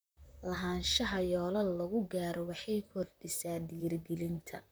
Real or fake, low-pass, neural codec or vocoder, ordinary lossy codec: fake; none; vocoder, 44.1 kHz, 128 mel bands, Pupu-Vocoder; none